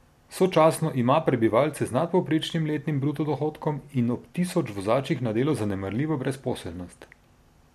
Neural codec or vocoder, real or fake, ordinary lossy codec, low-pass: none; real; MP3, 64 kbps; 14.4 kHz